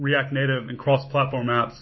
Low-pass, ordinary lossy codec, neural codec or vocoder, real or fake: 7.2 kHz; MP3, 24 kbps; autoencoder, 48 kHz, 128 numbers a frame, DAC-VAE, trained on Japanese speech; fake